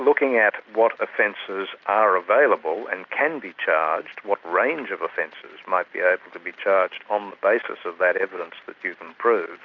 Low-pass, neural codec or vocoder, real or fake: 7.2 kHz; none; real